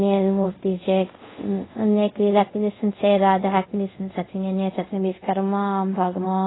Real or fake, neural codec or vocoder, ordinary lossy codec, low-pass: fake; codec, 24 kHz, 0.5 kbps, DualCodec; AAC, 16 kbps; 7.2 kHz